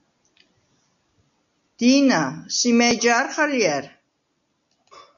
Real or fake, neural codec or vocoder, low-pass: real; none; 7.2 kHz